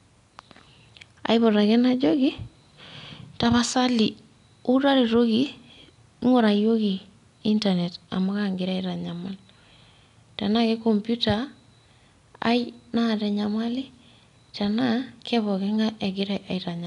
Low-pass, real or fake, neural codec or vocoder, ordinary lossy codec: 10.8 kHz; real; none; none